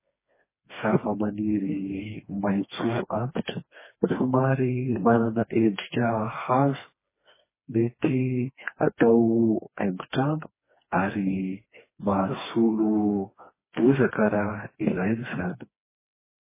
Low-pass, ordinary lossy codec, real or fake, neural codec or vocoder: 3.6 kHz; MP3, 16 kbps; fake; codec, 16 kHz, 2 kbps, FreqCodec, smaller model